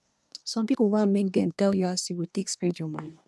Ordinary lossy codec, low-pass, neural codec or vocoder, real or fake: none; none; codec, 24 kHz, 1 kbps, SNAC; fake